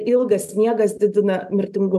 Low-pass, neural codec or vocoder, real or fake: 14.4 kHz; none; real